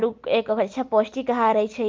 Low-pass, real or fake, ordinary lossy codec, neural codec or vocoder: 7.2 kHz; real; Opus, 32 kbps; none